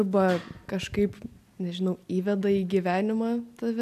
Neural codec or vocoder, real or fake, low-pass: none; real; 14.4 kHz